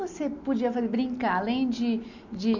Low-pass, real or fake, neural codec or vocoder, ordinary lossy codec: 7.2 kHz; real; none; none